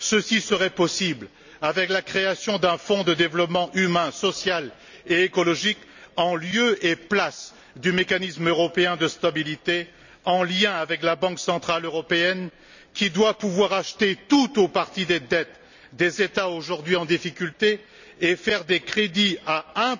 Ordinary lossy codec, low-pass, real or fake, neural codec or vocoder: none; 7.2 kHz; real; none